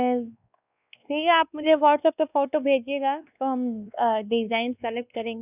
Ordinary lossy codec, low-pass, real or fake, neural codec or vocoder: none; 3.6 kHz; fake; codec, 16 kHz, 2 kbps, X-Codec, WavLM features, trained on Multilingual LibriSpeech